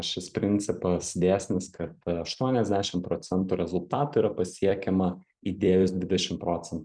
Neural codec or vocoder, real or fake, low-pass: vocoder, 44.1 kHz, 128 mel bands every 256 samples, BigVGAN v2; fake; 9.9 kHz